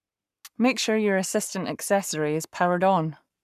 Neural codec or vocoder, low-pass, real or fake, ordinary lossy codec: codec, 44.1 kHz, 7.8 kbps, Pupu-Codec; 14.4 kHz; fake; none